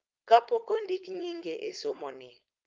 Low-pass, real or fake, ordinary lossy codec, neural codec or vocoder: 7.2 kHz; fake; Opus, 32 kbps; codec, 16 kHz, 4.8 kbps, FACodec